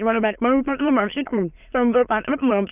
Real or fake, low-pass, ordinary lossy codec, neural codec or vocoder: fake; 3.6 kHz; none; autoencoder, 22.05 kHz, a latent of 192 numbers a frame, VITS, trained on many speakers